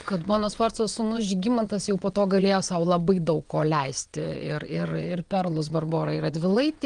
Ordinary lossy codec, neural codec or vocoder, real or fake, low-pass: Opus, 24 kbps; vocoder, 22.05 kHz, 80 mel bands, WaveNeXt; fake; 9.9 kHz